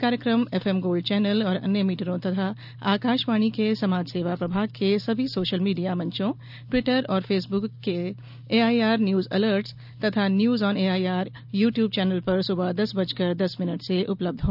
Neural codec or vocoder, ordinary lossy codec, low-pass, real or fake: none; none; 5.4 kHz; real